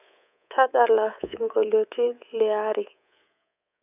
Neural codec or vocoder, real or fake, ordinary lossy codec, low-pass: codec, 24 kHz, 3.1 kbps, DualCodec; fake; none; 3.6 kHz